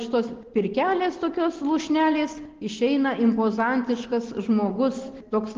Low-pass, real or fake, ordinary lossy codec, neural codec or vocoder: 7.2 kHz; real; Opus, 16 kbps; none